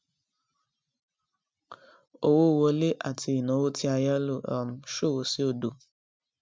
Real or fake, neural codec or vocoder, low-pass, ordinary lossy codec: real; none; none; none